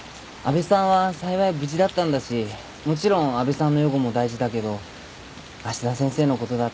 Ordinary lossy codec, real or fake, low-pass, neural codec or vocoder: none; real; none; none